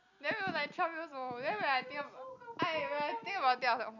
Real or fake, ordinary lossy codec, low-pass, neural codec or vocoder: real; none; 7.2 kHz; none